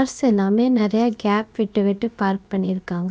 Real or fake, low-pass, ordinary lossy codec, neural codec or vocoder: fake; none; none; codec, 16 kHz, about 1 kbps, DyCAST, with the encoder's durations